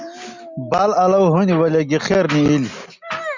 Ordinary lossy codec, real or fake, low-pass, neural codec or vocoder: Opus, 64 kbps; real; 7.2 kHz; none